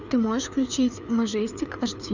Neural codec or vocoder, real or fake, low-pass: codec, 16 kHz, 4 kbps, FreqCodec, larger model; fake; 7.2 kHz